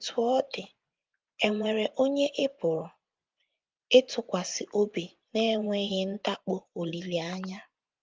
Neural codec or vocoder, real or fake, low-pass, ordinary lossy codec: vocoder, 24 kHz, 100 mel bands, Vocos; fake; 7.2 kHz; Opus, 32 kbps